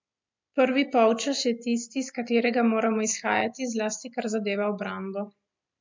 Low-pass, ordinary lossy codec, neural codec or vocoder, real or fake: 7.2 kHz; MP3, 64 kbps; none; real